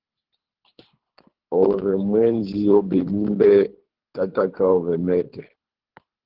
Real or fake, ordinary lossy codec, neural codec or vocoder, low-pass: fake; Opus, 16 kbps; codec, 24 kHz, 3 kbps, HILCodec; 5.4 kHz